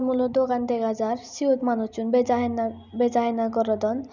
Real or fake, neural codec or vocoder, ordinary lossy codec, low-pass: real; none; none; 7.2 kHz